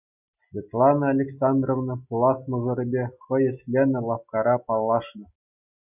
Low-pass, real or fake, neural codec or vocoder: 3.6 kHz; real; none